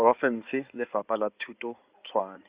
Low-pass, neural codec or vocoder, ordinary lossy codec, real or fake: 3.6 kHz; codec, 16 kHz in and 24 kHz out, 2.2 kbps, FireRedTTS-2 codec; Opus, 64 kbps; fake